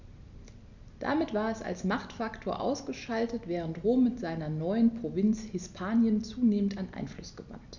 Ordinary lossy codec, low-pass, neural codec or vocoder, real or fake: none; 7.2 kHz; none; real